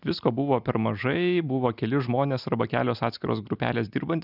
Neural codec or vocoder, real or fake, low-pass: none; real; 5.4 kHz